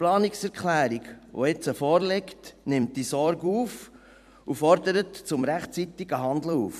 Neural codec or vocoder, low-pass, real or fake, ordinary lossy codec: vocoder, 44.1 kHz, 128 mel bands every 256 samples, BigVGAN v2; 14.4 kHz; fake; none